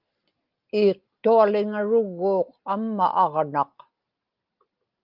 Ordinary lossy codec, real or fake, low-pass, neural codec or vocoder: Opus, 24 kbps; real; 5.4 kHz; none